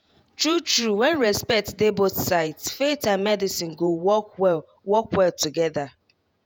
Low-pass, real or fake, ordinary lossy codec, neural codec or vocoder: none; fake; none; vocoder, 48 kHz, 128 mel bands, Vocos